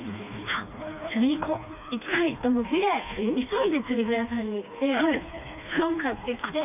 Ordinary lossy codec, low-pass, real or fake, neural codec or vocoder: none; 3.6 kHz; fake; codec, 16 kHz, 2 kbps, FreqCodec, smaller model